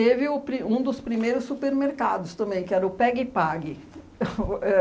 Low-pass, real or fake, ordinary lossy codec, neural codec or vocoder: none; real; none; none